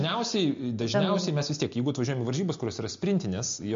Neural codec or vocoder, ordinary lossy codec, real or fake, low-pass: none; MP3, 48 kbps; real; 7.2 kHz